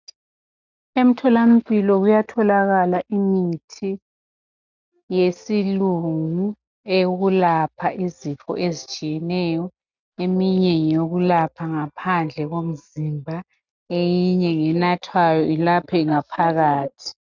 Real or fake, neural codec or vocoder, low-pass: real; none; 7.2 kHz